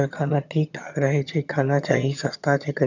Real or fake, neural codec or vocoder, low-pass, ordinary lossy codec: fake; vocoder, 22.05 kHz, 80 mel bands, HiFi-GAN; 7.2 kHz; none